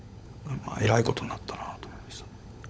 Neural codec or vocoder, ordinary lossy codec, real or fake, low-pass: codec, 16 kHz, 16 kbps, FunCodec, trained on LibriTTS, 50 frames a second; none; fake; none